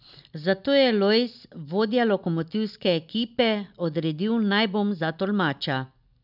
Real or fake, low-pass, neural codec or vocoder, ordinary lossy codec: real; 5.4 kHz; none; none